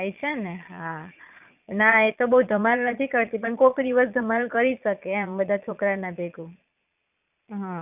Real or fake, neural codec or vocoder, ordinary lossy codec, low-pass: fake; vocoder, 22.05 kHz, 80 mel bands, Vocos; none; 3.6 kHz